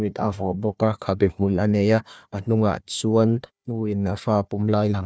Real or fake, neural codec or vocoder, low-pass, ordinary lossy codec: fake; codec, 16 kHz, 1 kbps, FunCodec, trained on Chinese and English, 50 frames a second; none; none